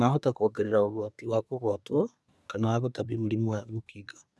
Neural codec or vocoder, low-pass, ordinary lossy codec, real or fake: codec, 24 kHz, 1 kbps, SNAC; none; none; fake